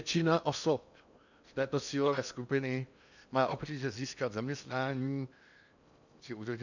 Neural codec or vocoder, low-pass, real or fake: codec, 16 kHz in and 24 kHz out, 0.8 kbps, FocalCodec, streaming, 65536 codes; 7.2 kHz; fake